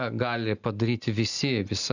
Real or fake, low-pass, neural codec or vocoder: real; 7.2 kHz; none